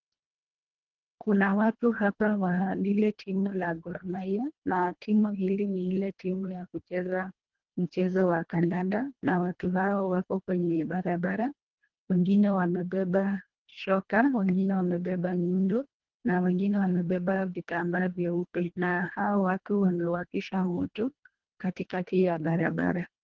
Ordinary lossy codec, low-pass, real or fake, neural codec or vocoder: Opus, 16 kbps; 7.2 kHz; fake; codec, 24 kHz, 1.5 kbps, HILCodec